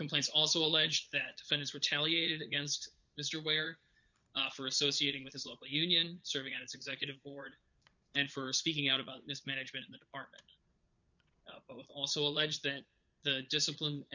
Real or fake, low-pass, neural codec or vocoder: fake; 7.2 kHz; vocoder, 22.05 kHz, 80 mel bands, Vocos